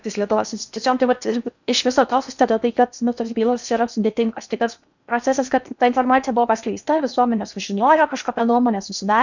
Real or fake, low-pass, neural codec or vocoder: fake; 7.2 kHz; codec, 16 kHz in and 24 kHz out, 0.8 kbps, FocalCodec, streaming, 65536 codes